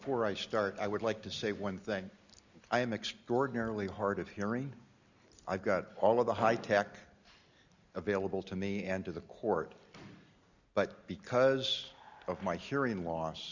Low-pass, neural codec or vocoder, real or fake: 7.2 kHz; none; real